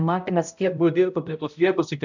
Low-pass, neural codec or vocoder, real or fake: 7.2 kHz; codec, 16 kHz, 0.5 kbps, X-Codec, HuBERT features, trained on balanced general audio; fake